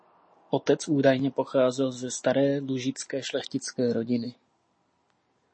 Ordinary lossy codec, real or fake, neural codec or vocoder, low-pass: MP3, 32 kbps; real; none; 9.9 kHz